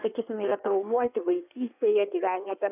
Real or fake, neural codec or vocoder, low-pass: fake; codec, 16 kHz in and 24 kHz out, 1.1 kbps, FireRedTTS-2 codec; 3.6 kHz